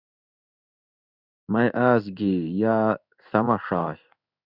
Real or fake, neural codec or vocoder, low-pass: fake; codec, 16 kHz in and 24 kHz out, 1 kbps, XY-Tokenizer; 5.4 kHz